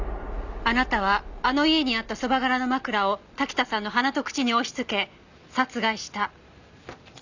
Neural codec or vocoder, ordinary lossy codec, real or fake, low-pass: none; none; real; 7.2 kHz